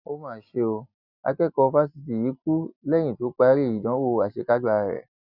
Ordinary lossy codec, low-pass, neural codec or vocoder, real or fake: none; 5.4 kHz; none; real